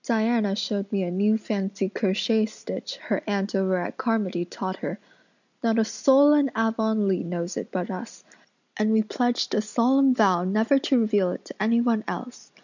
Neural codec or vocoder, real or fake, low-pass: none; real; 7.2 kHz